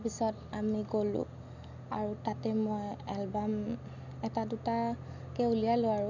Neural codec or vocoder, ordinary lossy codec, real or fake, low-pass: none; none; real; 7.2 kHz